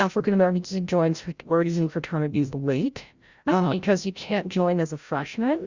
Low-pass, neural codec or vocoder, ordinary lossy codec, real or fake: 7.2 kHz; codec, 16 kHz, 0.5 kbps, FreqCodec, larger model; Opus, 64 kbps; fake